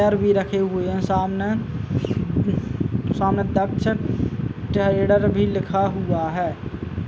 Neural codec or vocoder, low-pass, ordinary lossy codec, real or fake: none; none; none; real